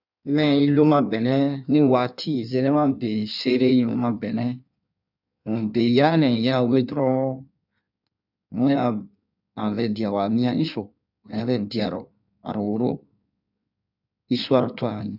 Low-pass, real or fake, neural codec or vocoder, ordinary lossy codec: 5.4 kHz; fake; codec, 16 kHz in and 24 kHz out, 1.1 kbps, FireRedTTS-2 codec; none